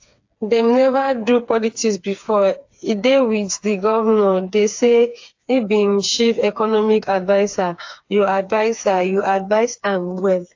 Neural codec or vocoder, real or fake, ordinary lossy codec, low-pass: codec, 16 kHz, 4 kbps, FreqCodec, smaller model; fake; AAC, 48 kbps; 7.2 kHz